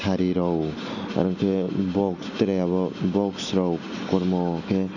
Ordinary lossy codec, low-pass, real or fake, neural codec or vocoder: none; 7.2 kHz; real; none